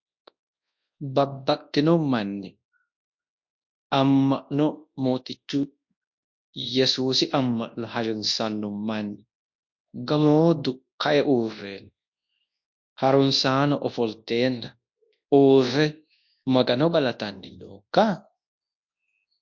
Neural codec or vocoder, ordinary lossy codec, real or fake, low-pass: codec, 24 kHz, 0.9 kbps, WavTokenizer, large speech release; MP3, 48 kbps; fake; 7.2 kHz